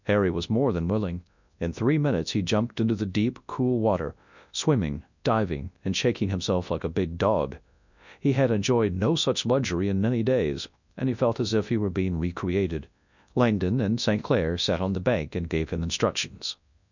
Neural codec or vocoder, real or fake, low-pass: codec, 24 kHz, 0.9 kbps, WavTokenizer, large speech release; fake; 7.2 kHz